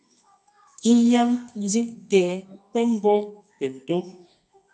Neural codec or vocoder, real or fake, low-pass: codec, 24 kHz, 0.9 kbps, WavTokenizer, medium music audio release; fake; 10.8 kHz